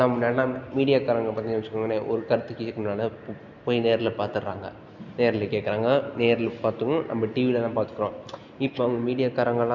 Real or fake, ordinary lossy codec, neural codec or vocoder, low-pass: real; none; none; 7.2 kHz